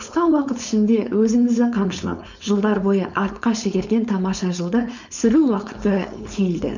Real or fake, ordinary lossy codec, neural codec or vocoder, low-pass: fake; none; codec, 16 kHz, 4.8 kbps, FACodec; 7.2 kHz